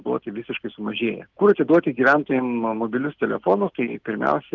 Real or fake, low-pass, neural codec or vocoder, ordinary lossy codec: real; 7.2 kHz; none; Opus, 16 kbps